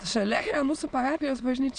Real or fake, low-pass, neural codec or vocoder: fake; 9.9 kHz; autoencoder, 22.05 kHz, a latent of 192 numbers a frame, VITS, trained on many speakers